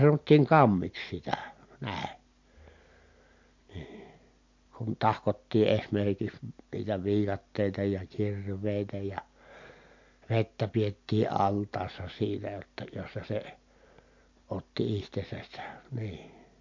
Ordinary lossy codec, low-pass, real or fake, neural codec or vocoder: MP3, 48 kbps; 7.2 kHz; real; none